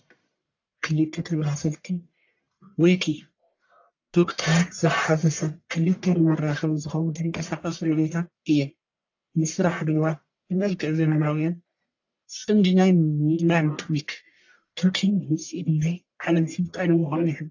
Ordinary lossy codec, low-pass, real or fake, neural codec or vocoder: AAC, 48 kbps; 7.2 kHz; fake; codec, 44.1 kHz, 1.7 kbps, Pupu-Codec